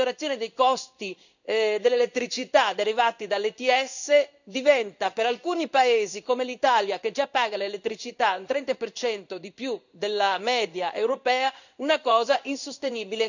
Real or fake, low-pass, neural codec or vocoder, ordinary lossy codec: fake; 7.2 kHz; codec, 16 kHz in and 24 kHz out, 1 kbps, XY-Tokenizer; none